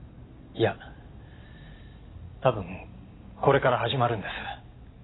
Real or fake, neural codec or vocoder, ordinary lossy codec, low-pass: real; none; AAC, 16 kbps; 7.2 kHz